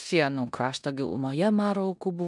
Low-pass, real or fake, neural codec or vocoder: 10.8 kHz; fake; codec, 16 kHz in and 24 kHz out, 0.9 kbps, LongCat-Audio-Codec, four codebook decoder